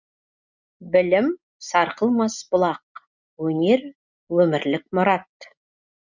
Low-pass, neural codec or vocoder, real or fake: 7.2 kHz; none; real